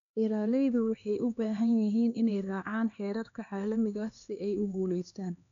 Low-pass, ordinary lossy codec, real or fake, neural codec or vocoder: 7.2 kHz; none; fake; codec, 16 kHz, 2 kbps, X-Codec, HuBERT features, trained on LibriSpeech